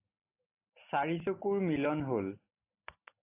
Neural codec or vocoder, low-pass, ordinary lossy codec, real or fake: none; 3.6 kHz; AAC, 24 kbps; real